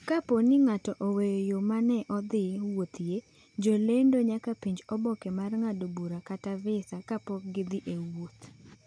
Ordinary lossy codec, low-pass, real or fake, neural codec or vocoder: none; 9.9 kHz; real; none